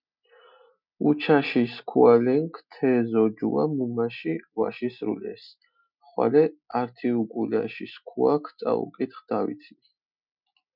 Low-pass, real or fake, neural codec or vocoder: 5.4 kHz; real; none